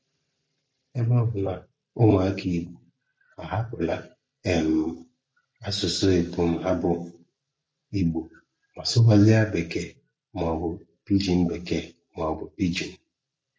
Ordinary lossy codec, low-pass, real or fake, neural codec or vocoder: none; none; real; none